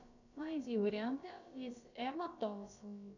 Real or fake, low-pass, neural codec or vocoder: fake; 7.2 kHz; codec, 16 kHz, about 1 kbps, DyCAST, with the encoder's durations